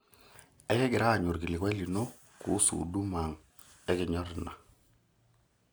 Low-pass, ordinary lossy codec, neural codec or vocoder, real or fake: none; none; none; real